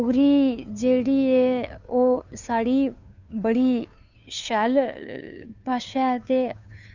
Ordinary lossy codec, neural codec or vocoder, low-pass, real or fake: none; codec, 16 kHz, 2 kbps, FunCodec, trained on Chinese and English, 25 frames a second; 7.2 kHz; fake